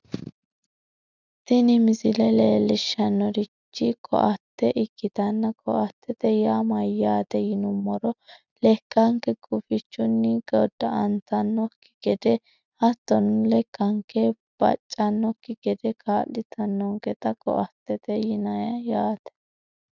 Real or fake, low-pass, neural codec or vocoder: real; 7.2 kHz; none